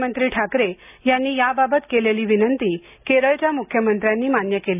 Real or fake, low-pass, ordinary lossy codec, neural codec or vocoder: real; 3.6 kHz; none; none